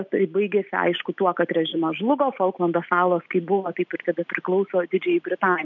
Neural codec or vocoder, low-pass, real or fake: none; 7.2 kHz; real